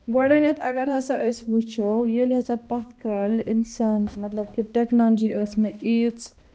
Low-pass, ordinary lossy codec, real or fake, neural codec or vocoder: none; none; fake; codec, 16 kHz, 1 kbps, X-Codec, HuBERT features, trained on balanced general audio